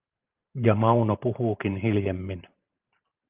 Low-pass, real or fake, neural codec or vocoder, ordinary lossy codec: 3.6 kHz; fake; vocoder, 44.1 kHz, 128 mel bands every 512 samples, BigVGAN v2; Opus, 32 kbps